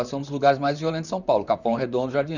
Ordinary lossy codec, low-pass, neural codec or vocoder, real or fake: none; 7.2 kHz; vocoder, 44.1 kHz, 128 mel bands, Pupu-Vocoder; fake